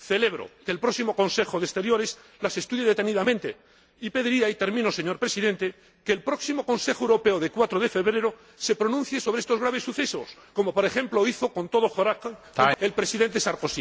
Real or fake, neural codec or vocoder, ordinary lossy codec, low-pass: real; none; none; none